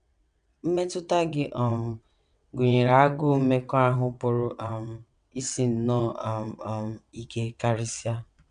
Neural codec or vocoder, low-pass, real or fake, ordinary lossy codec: vocoder, 22.05 kHz, 80 mel bands, WaveNeXt; 9.9 kHz; fake; none